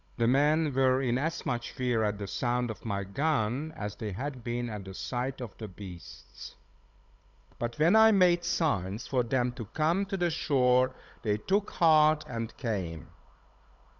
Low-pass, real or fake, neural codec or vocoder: 7.2 kHz; fake; codec, 16 kHz, 16 kbps, FunCodec, trained on Chinese and English, 50 frames a second